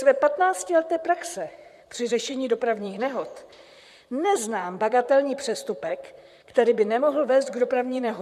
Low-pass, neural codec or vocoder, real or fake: 14.4 kHz; vocoder, 44.1 kHz, 128 mel bands, Pupu-Vocoder; fake